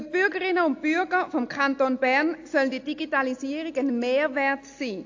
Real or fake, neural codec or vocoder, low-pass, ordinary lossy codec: real; none; 7.2 kHz; AAC, 48 kbps